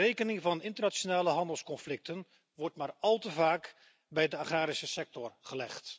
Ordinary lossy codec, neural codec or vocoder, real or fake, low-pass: none; none; real; none